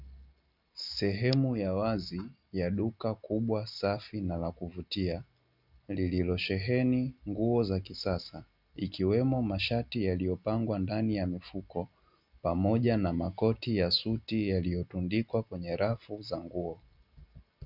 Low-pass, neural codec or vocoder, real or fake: 5.4 kHz; none; real